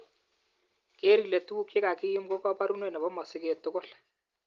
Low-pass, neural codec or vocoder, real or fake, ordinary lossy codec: 7.2 kHz; none; real; Opus, 32 kbps